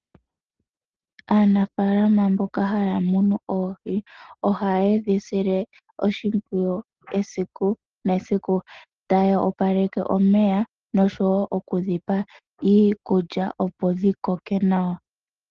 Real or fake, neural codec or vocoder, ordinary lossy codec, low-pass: real; none; Opus, 16 kbps; 7.2 kHz